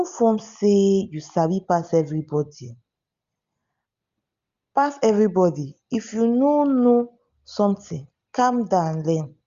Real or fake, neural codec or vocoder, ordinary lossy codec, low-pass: real; none; Opus, 64 kbps; 7.2 kHz